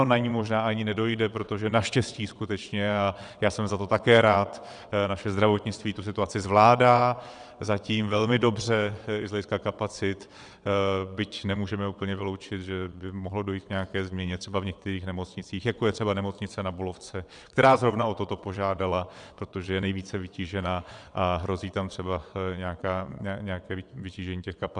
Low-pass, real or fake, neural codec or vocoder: 9.9 kHz; fake; vocoder, 22.05 kHz, 80 mel bands, WaveNeXt